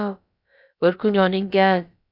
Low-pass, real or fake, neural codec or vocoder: 5.4 kHz; fake; codec, 16 kHz, about 1 kbps, DyCAST, with the encoder's durations